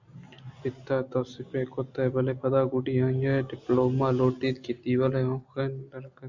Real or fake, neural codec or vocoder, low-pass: real; none; 7.2 kHz